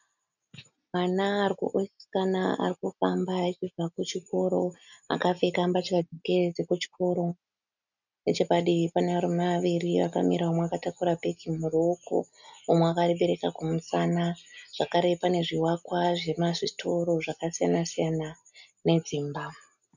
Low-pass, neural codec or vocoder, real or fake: 7.2 kHz; none; real